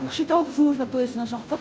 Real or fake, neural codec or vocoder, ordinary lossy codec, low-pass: fake; codec, 16 kHz, 0.5 kbps, FunCodec, trained on Chinese and English, 25 frames a second; none; none